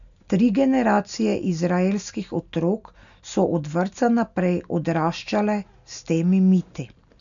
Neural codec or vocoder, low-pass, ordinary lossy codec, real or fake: none; 7.2 kHz; none; real